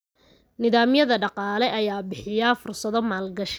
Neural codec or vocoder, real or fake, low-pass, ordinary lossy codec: none; real; none; none